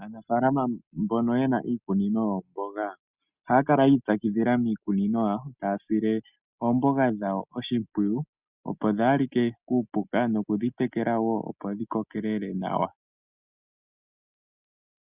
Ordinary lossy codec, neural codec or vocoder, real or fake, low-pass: Opus, 64 kbps; none; real; 3.6 kHz